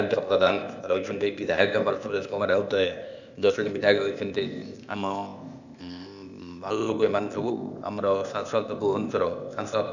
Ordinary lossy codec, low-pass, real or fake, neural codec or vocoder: none; 7.2 kHz; fake; codec, 16 kHz, 0.8 kbps, ZipCodec